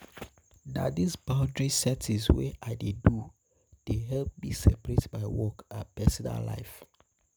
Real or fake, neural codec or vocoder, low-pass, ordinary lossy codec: real; none; none; none